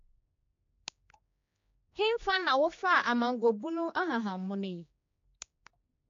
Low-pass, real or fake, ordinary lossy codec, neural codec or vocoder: 7.2 kHz; fake; AAC, 48 kbps; codec, 16 kHz, 2 kbps, X-Codec, HuBERT features, trained on general audio